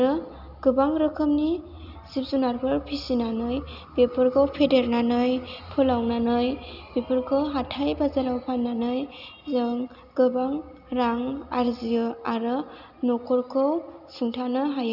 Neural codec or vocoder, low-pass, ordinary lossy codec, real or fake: none; 5.4 kHz; none; real